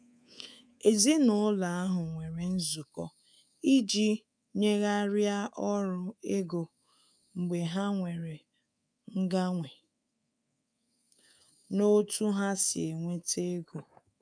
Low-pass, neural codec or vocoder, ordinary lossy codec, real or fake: 9.9 kHz; codec, 24 kHz, 3.1 kbps, DualCodec; none; fake